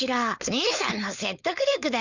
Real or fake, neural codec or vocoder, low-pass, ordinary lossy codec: fake; codec, 16 kHz, 4.8 kbps, FACodec; 7.2 kHz; none